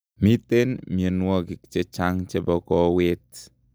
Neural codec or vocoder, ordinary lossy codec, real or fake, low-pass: none; none; real; none